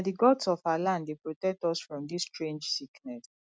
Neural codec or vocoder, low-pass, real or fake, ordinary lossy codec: none; none; real; none